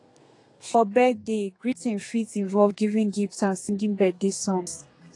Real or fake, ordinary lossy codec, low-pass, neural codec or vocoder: fake; AAC, 48 kbps; 10.8 kHz; codec, 32 kHz, 1.9 kbps, SNAC